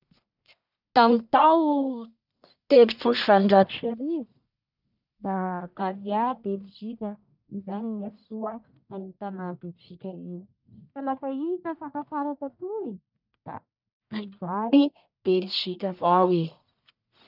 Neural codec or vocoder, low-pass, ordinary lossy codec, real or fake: codec, 44.1 kHz, 1.7 kbps, Pupu-Codec; 5.4 kHz; none; fake